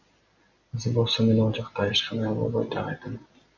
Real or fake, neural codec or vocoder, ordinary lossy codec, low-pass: real; none; Opus, 64 kbps; 7.2 kHz